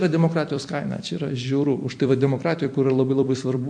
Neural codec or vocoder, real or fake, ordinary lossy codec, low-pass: vocoder, 44.1 kHz, 128 mel bands every 256 samples, BigVGAN v2; fake; MP3, 48 kbps; 9.9 kHz